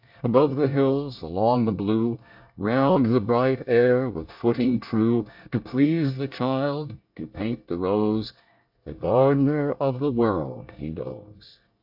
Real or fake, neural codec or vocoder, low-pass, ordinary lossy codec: fake; codec, 24 kHz, 1 kbps, SNAC; 5.4 kHz; AAC, 48 kbps